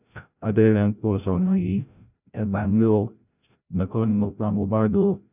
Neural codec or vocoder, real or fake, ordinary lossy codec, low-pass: codec, 16 kHz, 0.5 kbps, FreqCodec, larger model; fake; none; 3.6 kHz